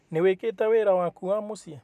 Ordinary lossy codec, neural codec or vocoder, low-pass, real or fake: none; none; 14.4 kHz; real